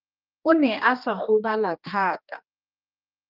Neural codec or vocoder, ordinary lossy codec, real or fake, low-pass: codec, 16 kHz, 2 kbps, X-Codec, HuBERT features, trained on general audio; Opus, 24 kbps; fake; 5.4 kHz